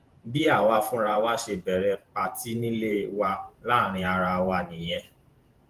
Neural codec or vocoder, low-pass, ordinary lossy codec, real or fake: vocoder, 48 kHz, 128 mel bands, Vocos; 14.4 kHz; Opus, 24 kbps; fake